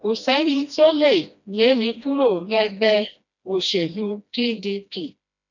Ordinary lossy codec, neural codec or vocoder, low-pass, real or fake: none; codec, 16 kHz, 1 kbps, FreqCodec, smaller model; 7.2 kHz; fake